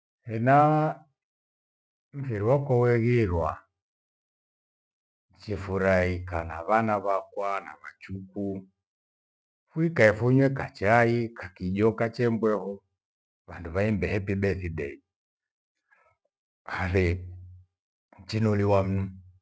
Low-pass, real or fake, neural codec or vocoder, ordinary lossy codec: none; real; none; none